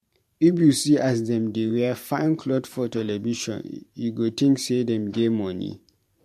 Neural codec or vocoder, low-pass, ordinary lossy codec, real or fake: vocoder, 44.1 kHz, 128 mel bands every 512 samples, BigVGAN v2; 14.4 kHz; MP3, 64 kbps; fake